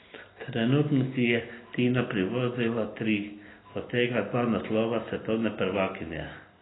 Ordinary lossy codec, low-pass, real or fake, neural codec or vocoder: AAC, 16 kbps; 7.2 kHz; real; none